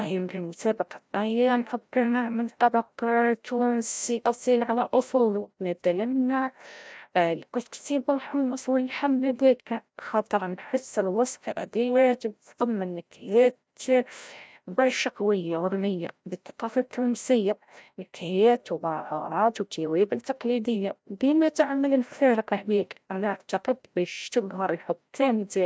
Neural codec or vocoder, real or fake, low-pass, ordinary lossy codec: codec, 16 kHz, 0.5 kbps, FreqCodec, larger model; fake; none; none